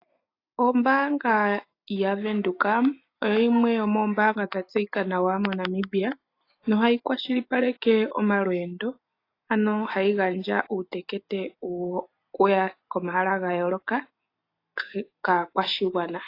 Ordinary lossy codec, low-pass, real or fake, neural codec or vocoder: AAC, 32 kbps; 5.4 kHz; real; none